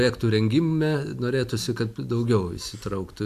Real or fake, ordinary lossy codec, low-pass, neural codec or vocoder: real; AAC, 96 kbps; 14.4 kHz; none